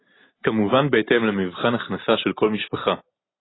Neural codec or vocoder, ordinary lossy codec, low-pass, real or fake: none; AAC, 16 kbps; 7.2 kHz; real